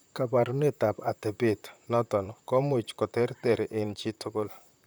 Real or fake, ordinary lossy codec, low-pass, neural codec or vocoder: fake; none; none; vocoder, 44.1 kHz, 128 mel bands, Pupu-Vocoder